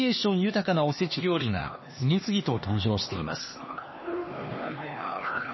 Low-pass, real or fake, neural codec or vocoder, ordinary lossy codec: 7.2 kHz; fake; codec, 16 kHz, 2 kbps, X-Codec, HuBERT features, trained on LibriSpeech; MP3, 24 kbps